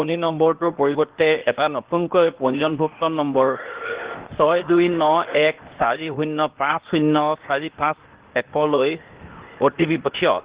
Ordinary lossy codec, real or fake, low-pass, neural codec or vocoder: Opus, 16 kbps; fake; 3.6 kHz; codec, 16 kHz, 0.8 kbps, ZipCodec